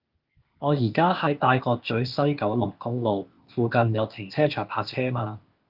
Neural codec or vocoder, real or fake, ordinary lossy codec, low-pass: codec, 16 kHz, 0.8 kbps, ZipCodec; fake; Opus, 24 kbps; 5.4 kHz